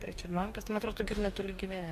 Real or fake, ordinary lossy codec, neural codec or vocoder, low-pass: fake; MP3, 64 kbps; codec, 44.1 kHz, 2.6 kbps, DAC; 14.4 kHz